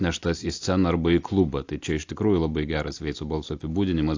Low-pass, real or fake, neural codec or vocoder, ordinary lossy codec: 7.2 kHz; real; none; AAC, 48 kbps